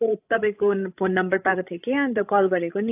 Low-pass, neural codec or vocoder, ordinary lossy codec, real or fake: 3.6 kHz; codec, 16 kHz, 16 kbps, FreqCodec, larger model; AAC, 32 kbps; fake